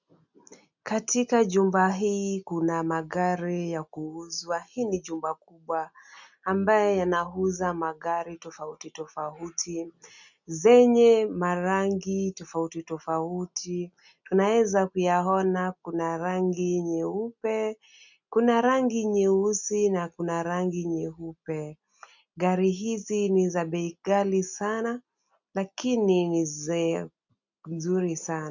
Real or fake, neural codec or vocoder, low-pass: real; none; 7.2 kHz